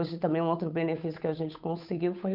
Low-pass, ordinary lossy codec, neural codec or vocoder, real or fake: 5.4 kHz; none; codec, 16 kHz, 8 kbps, FunCodec, trained on Chinese and English, 25 frames a second; fake